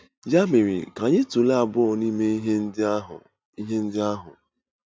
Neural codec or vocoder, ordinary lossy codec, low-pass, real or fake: none; none; none; real